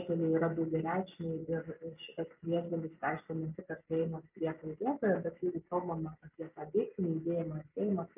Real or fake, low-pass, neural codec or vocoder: real; 3.6 kHz; none